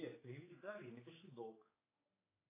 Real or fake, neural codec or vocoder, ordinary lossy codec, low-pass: fake; codec, 16 kHz, 4 kbps, X-Codec, HuBERT features, trained on general audio; AAC, 16 kbps; 3.6 kHz